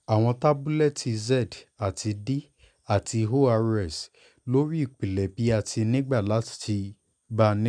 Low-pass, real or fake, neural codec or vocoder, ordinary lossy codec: 9.9 kHz; real; none; none